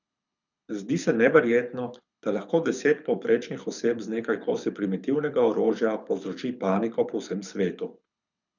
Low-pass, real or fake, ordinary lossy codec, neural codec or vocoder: 7.2 kHz; fake; none; codec, 24 kHz, 6 kbps, HILCodec